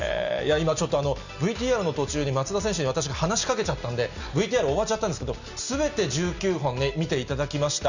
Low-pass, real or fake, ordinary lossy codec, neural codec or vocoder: 7.2 kHz; real; none; none